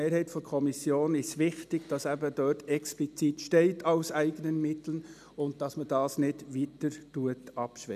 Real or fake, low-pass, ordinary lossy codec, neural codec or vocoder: real; 14.4 kHz; none; none